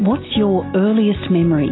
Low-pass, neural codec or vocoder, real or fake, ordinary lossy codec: 7.2 kHz; none; real; AAC, 16 kbps